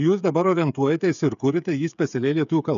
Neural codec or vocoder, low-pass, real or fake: codec, 16 kHz, 8 kbps, FreqCodec, smaller model; 7.2 kHz; fake